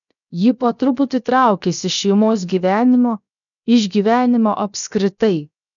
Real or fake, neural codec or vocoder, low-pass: fake; codec, 16 kHz, 0.7 kbps, FocalCodec; 7.2 kHz